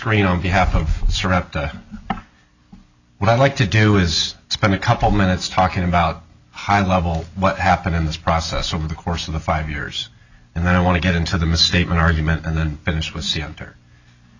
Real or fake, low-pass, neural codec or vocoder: real; 7.2 kHz; none